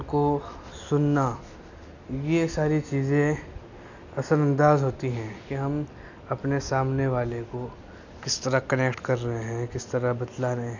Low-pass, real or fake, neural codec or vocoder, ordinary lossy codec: 7.2 kHz; real; none; none